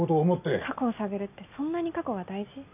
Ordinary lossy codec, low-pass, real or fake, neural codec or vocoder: none; 3.6 kHz; real; none